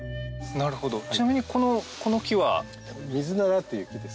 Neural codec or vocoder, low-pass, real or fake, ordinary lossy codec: none; none; real; none